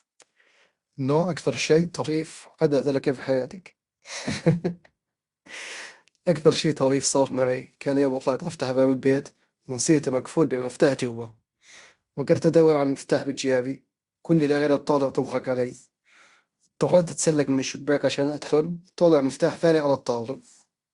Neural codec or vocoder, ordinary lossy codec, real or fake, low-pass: codec, 16 kHz in and 24 kHz out, 0.9 kbps, LongCat-Audio-Codec, fine tuned four codebook decoder; Opus, 64 kbps; fake; 10.8 kHz